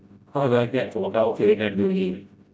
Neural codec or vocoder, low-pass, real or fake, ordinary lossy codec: codec, 16 kHz, 0.5 kbps, FreqCodec, smaller model; none; fake; none